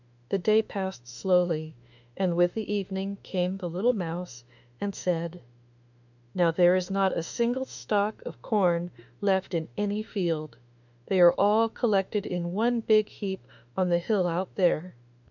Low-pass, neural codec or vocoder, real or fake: 7.2 kHz; autoencoder, 48 kHz, 32 numbers a frame, DAC-VAE, trained on Japanese speech; fake